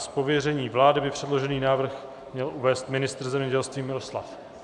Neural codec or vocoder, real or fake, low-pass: vocoder, 44.1 kHz, 128 mel bands every 512 samples, BigVGAN v2; fake; 10.8 kHz